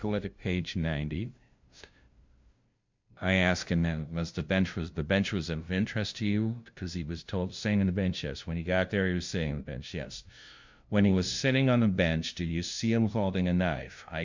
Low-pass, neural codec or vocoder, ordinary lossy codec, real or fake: 7.2 kHz; codec, 16 kHz, 0.5 kbps, FunCodec, trained on LibriTTS, 25 frames a second; MP3, 64 kbps; fake